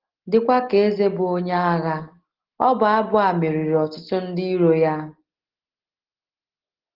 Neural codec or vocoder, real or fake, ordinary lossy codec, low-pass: none; real; Opus, 16 kbps; 5.4 kHz